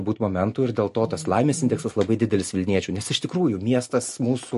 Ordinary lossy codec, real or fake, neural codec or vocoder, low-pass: MP3, 48 kbps; real; none; 14.4 kHz